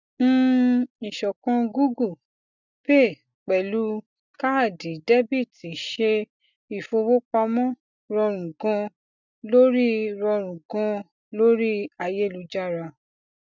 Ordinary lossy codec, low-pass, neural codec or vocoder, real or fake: none; 7.2 kHz; none; real